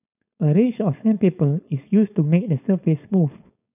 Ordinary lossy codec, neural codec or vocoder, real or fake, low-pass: none; codec, 16 kHz, 4.8 kbps, FACodec; fake; 3.6 kHz